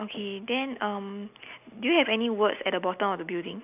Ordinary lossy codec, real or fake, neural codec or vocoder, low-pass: none; real; none; 3.6 kHz